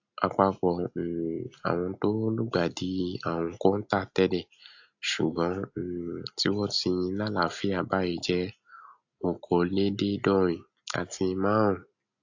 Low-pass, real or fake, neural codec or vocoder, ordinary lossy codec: 7.2 kHz; real; none; AAC, 48 kbps